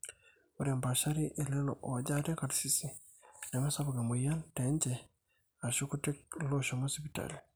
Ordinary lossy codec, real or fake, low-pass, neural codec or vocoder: none; real; none; none